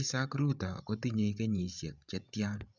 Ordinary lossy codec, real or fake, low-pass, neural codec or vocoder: none; fake; 7.2 kHz; codec, 16 kHz, 16 kbps, FreqCodec, larger model